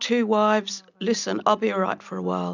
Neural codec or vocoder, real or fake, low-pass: none; real; 7.2 kHz